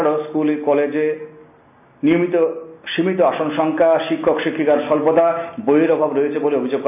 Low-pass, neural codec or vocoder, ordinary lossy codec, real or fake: 3.6 kHz; none; none; real